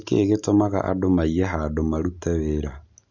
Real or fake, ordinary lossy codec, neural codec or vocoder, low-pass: real; none; none; 7.2 kHz